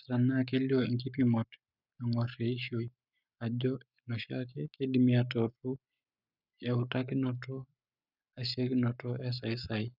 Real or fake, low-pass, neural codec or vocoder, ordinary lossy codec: fake; 5.4 kHz; codec, 44.1 kHz, 7.8 kbps, Pupu-Codec; none